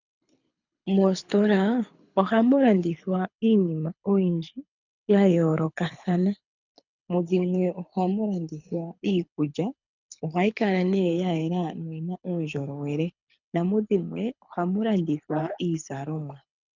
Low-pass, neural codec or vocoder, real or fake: 7.2 kHz; codec, 24 kHz, 6 kbps, HILCodec; fake